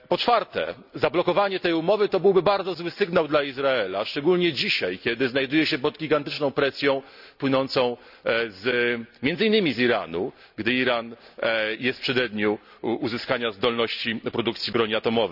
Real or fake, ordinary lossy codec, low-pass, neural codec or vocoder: real; none; 5.4 kHz; none